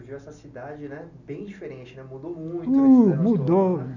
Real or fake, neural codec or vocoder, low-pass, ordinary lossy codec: real; none; 7.2 kHz; none